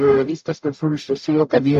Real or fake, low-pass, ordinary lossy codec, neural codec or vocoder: fake; 14.4 kHz; MP3, 96 kbps; codec, 44.1 kHz, 0.9 kbps, DAC